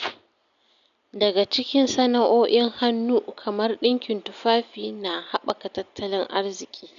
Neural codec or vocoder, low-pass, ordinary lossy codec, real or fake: none; 7.2 kHz; none; real